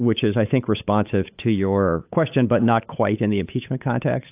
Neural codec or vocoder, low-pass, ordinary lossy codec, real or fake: codec, 16 kHz, 8 kbps, FunCodec, trained on Chinese and English, 25 frames a second; 3.6 kHz; AAC, 32 kbps; fake